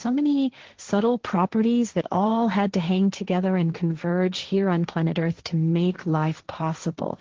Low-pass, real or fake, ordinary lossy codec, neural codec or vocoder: 7.2 kHz; fake; Opus, 16 kbps; codec, 16 kHz, 1.1 kbps, Voila-Tokenizer